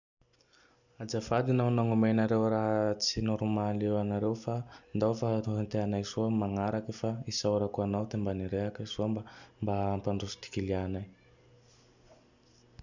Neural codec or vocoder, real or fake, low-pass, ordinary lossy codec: none; real; 7.2 kHz; none